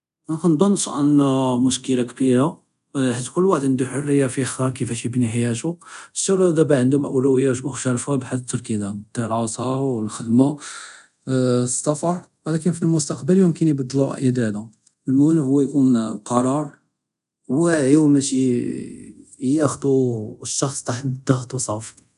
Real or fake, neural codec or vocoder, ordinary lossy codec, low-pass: fake; codec, 24 kHz, 0.5 kbps, DualCodec; none; 10.8 kHz